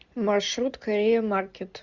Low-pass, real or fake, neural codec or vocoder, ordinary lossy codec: 7.2 kHz; real; none; Opus, 64 kbps